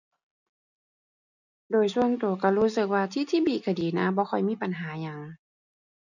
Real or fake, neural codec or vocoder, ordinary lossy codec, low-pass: real; none; none; 7.2 kHz